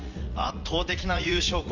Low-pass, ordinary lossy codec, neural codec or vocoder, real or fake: 7.2 kHz; none; vocoder, 44.1 kHz, 80 mel bands, Vocos; fake